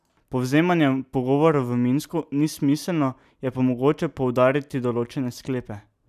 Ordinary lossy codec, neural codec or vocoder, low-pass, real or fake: none; none; 14.4 kHz; real